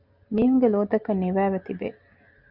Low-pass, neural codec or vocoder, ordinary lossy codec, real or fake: 5.4 kHz; none; Opus, 64 kbps; real